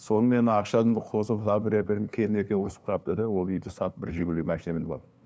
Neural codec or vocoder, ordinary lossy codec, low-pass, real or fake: codec, 16 kHz, 2 kbps, FunCodec, trained on LibriTTS, 25 frames a second; none; none; fake